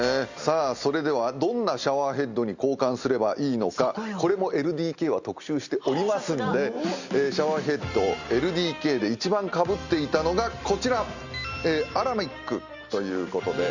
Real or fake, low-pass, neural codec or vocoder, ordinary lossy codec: real; 7.2 kHz; none; Opus, 64 kbps